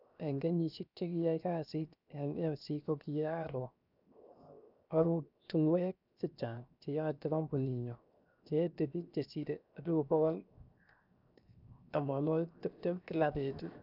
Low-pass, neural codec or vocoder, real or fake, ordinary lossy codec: 5.4 kHz; codec, 16 kHz, 0.7 kbps, FocalCodec; fake; none